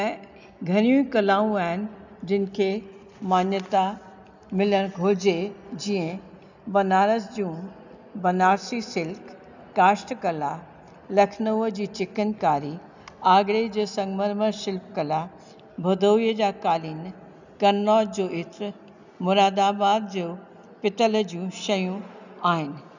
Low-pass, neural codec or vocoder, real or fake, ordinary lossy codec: 7.2 kHz; none; real; none